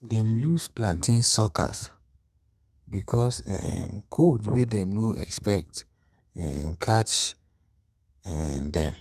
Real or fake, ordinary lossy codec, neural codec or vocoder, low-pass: fake; none; codec, 32 kHz, 1.9 kbps, SNAC; 14.4 kHz